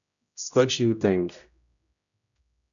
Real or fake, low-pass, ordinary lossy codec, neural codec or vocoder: fake; 7.2 kHz; MP3, 96 kbps; codec, 16 kHz, 0.5 kbps, X-Codec, HuBERT features, trained on general audio